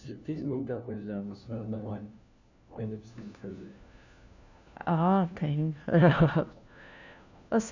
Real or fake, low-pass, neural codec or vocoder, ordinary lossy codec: fake; 7.2 kHz; codec, 16 kHz, 1 kbps, FunCodec, trained on LibriTTS, 50 frames a second; none